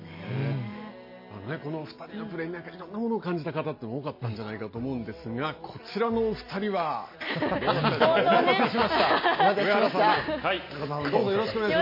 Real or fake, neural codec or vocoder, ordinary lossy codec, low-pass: real; none; MP3, 24 kbps; 5.4 kHz